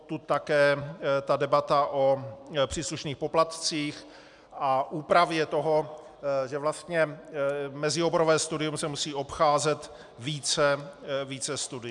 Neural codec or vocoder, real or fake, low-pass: none; real; 10.8 kHz